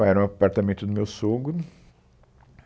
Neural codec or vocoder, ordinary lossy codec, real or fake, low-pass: none; none; real; none